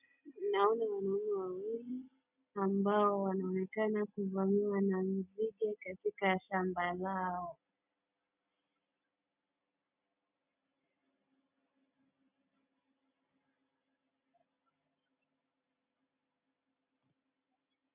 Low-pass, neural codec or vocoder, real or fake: 3.6 kHz; none; real